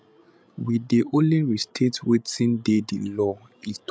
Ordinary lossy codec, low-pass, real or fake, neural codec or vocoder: none; none; fake; codec, 16 kHz, 16 kbps, FreqCodec, larger model